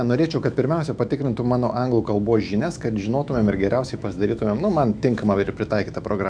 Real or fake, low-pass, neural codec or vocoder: fake; 9.9 kHz; autoencoder, 48 kHz, 128 numbers a frame, DAC-VAE, trained on Japanese speech